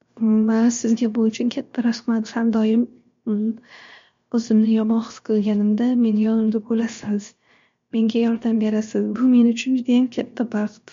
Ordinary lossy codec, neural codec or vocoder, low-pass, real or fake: MP3, 48 kbps; codec, 16 kHz, 0.7 kbps, FocalCodec; 7.2 kHz; fake